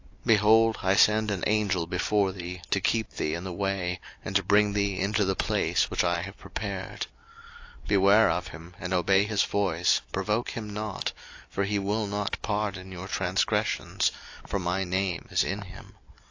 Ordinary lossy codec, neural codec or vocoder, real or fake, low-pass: AAC, 48 kbps; none; real; 7.2 kHz